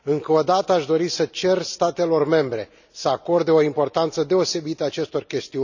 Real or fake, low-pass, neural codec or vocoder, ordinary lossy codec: real; 7.2 kHz; none; none